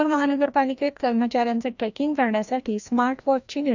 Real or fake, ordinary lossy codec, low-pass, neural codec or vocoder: fake; none; 7.2 kHz; codec, 16 kHz, 1 kbps, FreqCodec, larger model